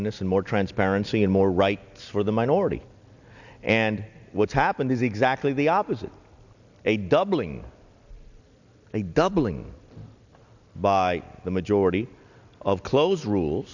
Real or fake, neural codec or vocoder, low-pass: real; none; 7.2 kHz